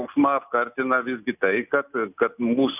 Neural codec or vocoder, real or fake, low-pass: none; real; 3.6 kHz